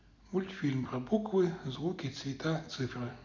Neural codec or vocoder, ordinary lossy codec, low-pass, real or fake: none; none; 7.2 kHz; real